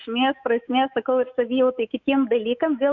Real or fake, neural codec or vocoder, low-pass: fake; autoencoder, 48 kHz, 128 numbers a frame, DAC-VAE, trained on Japanese speech; 7.2 kHz